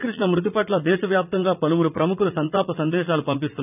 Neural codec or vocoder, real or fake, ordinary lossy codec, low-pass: vocoder, 44.1 kHz, 80 mel bands, Vocos; fake; none; 3.6 kHz